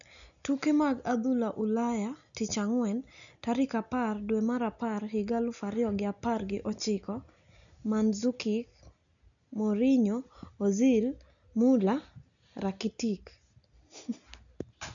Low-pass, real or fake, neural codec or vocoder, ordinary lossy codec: 7.2 kHz; real; none; none